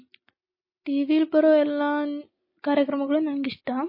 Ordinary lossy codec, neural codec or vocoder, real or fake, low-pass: MP3, 24 kbps; none; real; 5.4 kHz